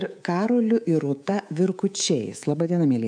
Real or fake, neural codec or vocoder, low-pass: fake; codec, 24 kHz, 3.1 kbps, DualCodec; 9.9 kHz